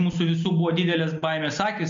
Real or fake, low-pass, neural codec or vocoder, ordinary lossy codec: real; 7.2 kHz; none; MP3, 48 kbps